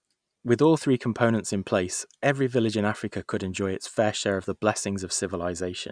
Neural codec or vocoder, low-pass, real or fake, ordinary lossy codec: none; 9.9 kHz; real; none